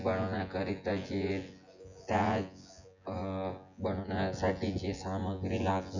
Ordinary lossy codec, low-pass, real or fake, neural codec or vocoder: none; 7.2 kHz; fake; vocoder, 24 kHz, 100 mel bands, Vocos